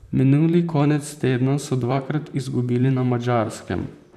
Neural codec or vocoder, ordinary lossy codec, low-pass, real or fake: vocoder, 44.1 kHz, 128 mel bands, Pupu-Vocoder; none; 14.4 kHz; fake